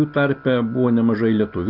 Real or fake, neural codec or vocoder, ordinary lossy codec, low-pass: real; none; AAC, 48 kbps; 5.4 kHz